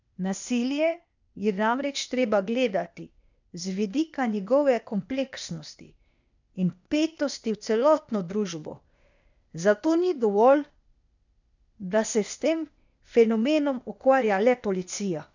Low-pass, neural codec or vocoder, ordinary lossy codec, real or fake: 7.2 kHz; codec, 16 kHz, 0.8 kbps, ZipCodec; none; fake